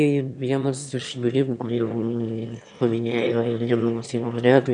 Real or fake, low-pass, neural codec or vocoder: fake; 9.9 kHz; autoencoder, 22.05 kHz, a latent of 192 numbers a frame, VITS, trained on one speaker